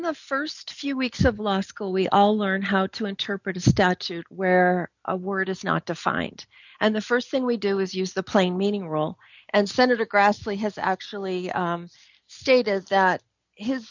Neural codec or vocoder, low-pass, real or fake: none; 7.2 kHz; real